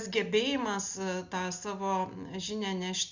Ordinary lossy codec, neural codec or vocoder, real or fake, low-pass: Opus, 64 kbps; none; real; 7.2 kHz